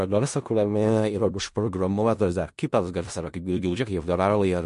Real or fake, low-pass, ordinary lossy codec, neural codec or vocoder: fake; 10.8 kHz; MP3, 48 kbps; codec, 16 kHz in and 24 kHz out, 0.4 kbps, LongCat-Audio-Codec, four codebook decoder